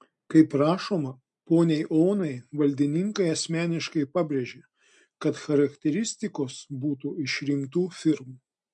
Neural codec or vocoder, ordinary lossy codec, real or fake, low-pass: none; MP3, 64 kbps; real; 10.8 kHz